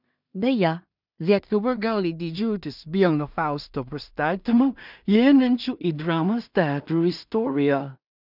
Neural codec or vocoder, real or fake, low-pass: codec, 16 kHz in and 24 kHz out, 0.4 kbps, LongCat-Audio-Codec, two codebook decoder; fake; 5.4 kHz